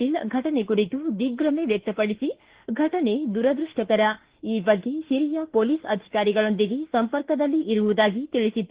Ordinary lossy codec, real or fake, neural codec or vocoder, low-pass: Opus, 16 kbps; fake; autoencoder, 48 kHz, 32 numbers a frame, DAC-VAE, trained on Japanese speech; 3.6 kHz